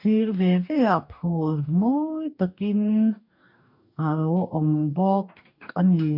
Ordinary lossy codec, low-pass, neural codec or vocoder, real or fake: none; 5.4 kHz; codec, 44.1 kHz, 2.6 kbps, DAC; fake